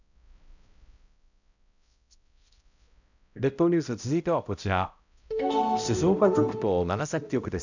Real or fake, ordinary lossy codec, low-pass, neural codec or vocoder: fake; none; 7.2 kHz; codec, 16 kHz, 0.5 kbps, X-Codec, HuBERT features, trained on balanced general audio